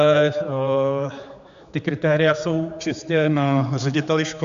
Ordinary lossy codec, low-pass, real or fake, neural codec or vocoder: MP3, 64 kbps; 7.2 kHz; fake; codec, 16 kHz, 4 kbps, X-Codec, HuBERT features, trained on general audio